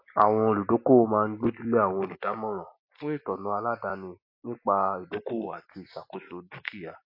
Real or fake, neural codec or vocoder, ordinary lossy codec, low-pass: real; none; MP3, 32 kbps; 5.4 kHz